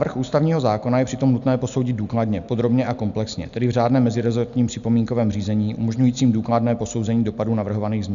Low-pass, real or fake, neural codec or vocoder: 7.2 kHz; real; none